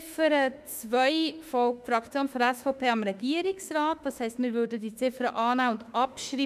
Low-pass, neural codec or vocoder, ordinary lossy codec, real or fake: 14.4 kHz; autoencoder, 48 kHz, 32 numbers a frame, DAC-VAE, trained on Japanese speech; none; fake